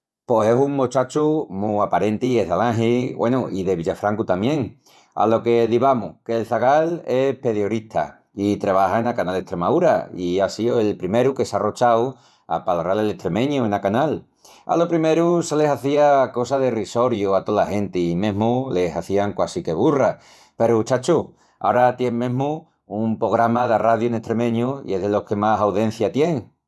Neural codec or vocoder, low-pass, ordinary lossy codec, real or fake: vocoder, 24 kHz, 100 mel bands, Vocos; none; none; fake